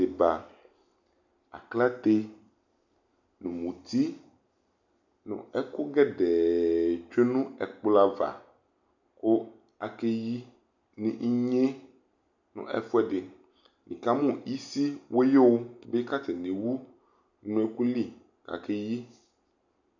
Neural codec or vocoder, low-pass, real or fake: none; 7.2 kHz; real